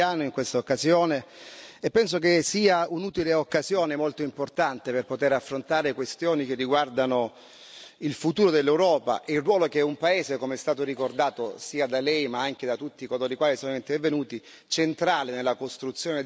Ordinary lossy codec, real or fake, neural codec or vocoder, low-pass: none; real; none; none